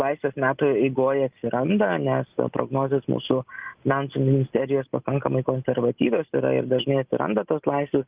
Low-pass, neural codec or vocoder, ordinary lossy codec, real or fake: 3.6 kHz; none; Opus, 24 kbps; real